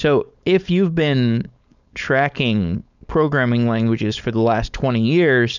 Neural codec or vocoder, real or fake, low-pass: codec, 16 kHz, 4.8 kbps, FACodec; fake; 7.2 kHz